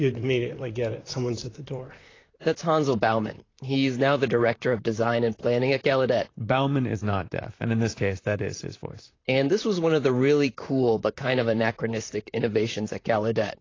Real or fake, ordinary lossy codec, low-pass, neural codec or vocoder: fake; AAC, 32 kbps; 7.2 kHz; vocoder, 44.1 kHz, 128 mel bands, Pupu-Vocoder